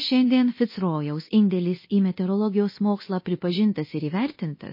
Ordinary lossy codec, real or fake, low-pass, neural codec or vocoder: MP3, 24 kbps; fake; 5.4 kHz; codec, 24 kHz, 0.9 kbps, DualCodec